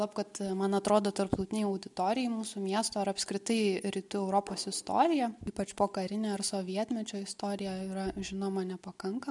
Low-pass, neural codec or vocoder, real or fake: 10.8 kHz; none; real